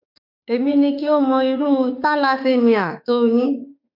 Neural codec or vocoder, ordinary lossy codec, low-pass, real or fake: autoencoder, 48 kHz, 32 numbers a frame, DAC-VAE, trained on Japanese speech; none; 5.4 kHz; fake